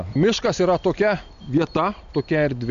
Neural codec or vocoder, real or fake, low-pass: none; real; 7.2 kHz